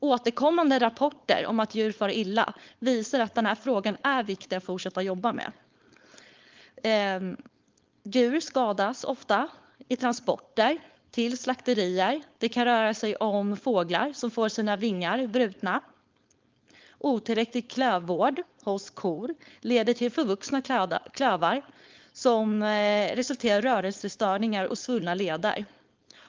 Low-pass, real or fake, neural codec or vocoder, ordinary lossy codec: 7.2 kHz; fake; codec, 16 kHz, 4.8 kbps, FACodec; Opus, 32 kbps